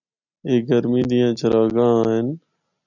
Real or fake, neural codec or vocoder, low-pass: real; none; 7.2 kHz